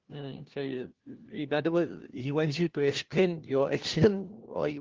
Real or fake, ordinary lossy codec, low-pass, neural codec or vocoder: fake; Opus, 16 kbps; 7.2 kHz; codec, 16 kHz, 1 kbps, FunCodec, trained on LibriTTS, 50 frames a second